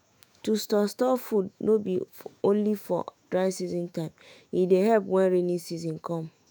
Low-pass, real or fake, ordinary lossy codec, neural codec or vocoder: none; fake; none; autoencoder, 48 kHz, 128 numbers a frame, DAC-VAE, trained on Japanese speech